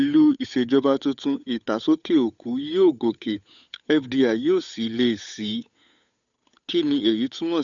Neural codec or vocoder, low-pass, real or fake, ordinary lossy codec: codec, 16 kHz, 8 kbps, FunCodec, trained on Chinese and English, 25 frames a second; 7.2 kHz; fake; none